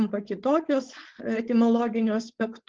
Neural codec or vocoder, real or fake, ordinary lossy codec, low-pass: codec, 16 kHz, 4.8 kbps, FACodec; fake; Opus, 16 kbps; 7.2 kHz